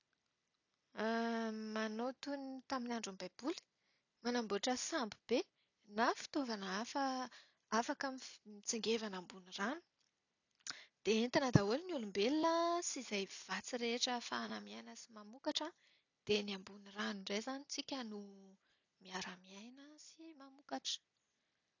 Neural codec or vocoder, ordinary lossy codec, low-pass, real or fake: none; none; 7.2 kHz; real